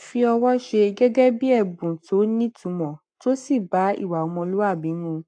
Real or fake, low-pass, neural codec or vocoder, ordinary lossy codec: fake; 9.9 kHz; codec, 44.1 kHz, 7.8 kbps, Pupu-Codec; AAC, 64 kbps